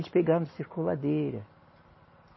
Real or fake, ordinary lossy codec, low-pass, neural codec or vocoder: real; MP3, 24 kbps; 7.2 kHz; none